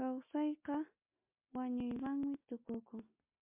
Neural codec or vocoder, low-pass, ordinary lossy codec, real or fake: none; 3.6 kHz; MP3, 32 kbps; real